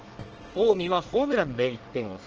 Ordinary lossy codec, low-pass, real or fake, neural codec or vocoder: Opus, 16 kbps; 7.2 kHz; fake; codec, 24 kHz, 1 kbps, SNAC